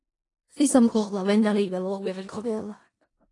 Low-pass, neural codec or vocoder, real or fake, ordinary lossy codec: 10.8 kHz; codec, 16 kHz in and 24 kHz out, 0.4 kbps, LongCat-Audio-Codec, four codebook decoder; fake; AAC, 32 kbps